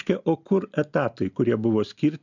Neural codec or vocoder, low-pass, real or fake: none; 7.2 kHz; real